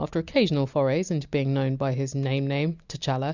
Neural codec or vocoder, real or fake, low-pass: none; real; 7.2 kHz